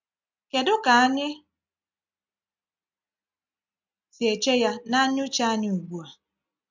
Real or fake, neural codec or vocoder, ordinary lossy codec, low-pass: real; none; MP3, 64 kbps; 7.2 kHz